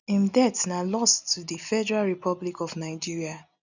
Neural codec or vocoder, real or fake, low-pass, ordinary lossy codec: none; real; 7.2 kHz; none